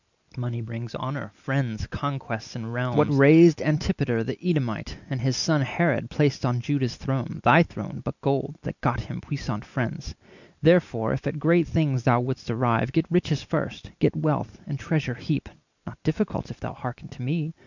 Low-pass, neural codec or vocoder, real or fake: 7.2 kHz; none; real